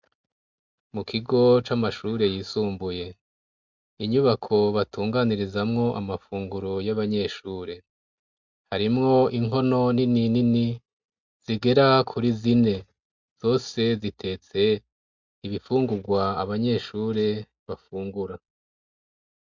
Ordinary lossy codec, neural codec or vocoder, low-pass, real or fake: MP3, 64 kbps; none; 7.2 kHz; real